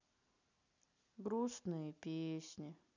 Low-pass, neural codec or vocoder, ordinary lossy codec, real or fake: 7.2 kHz; none; none; real